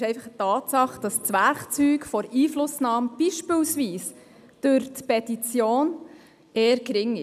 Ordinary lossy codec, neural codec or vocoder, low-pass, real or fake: none; none; 14.4 kHz; real